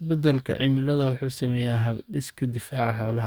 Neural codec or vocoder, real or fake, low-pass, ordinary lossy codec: codec, 44.1 kHz, 2.6 kbps, DAC; fake; none; none